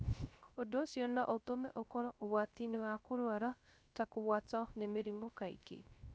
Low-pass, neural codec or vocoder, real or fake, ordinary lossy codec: none; codec, 16 kHz, 0.3 kbps, FocalCodec; fake; none